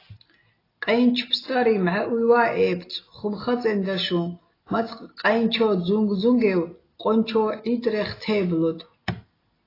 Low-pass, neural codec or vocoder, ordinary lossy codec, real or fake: 5.4 kHz; none; AAC, 24 kbps; real